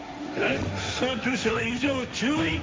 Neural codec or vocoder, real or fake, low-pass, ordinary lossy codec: codec, 16 kHz, 1.1 kbps, Voila-Tokenizer; fake; none; none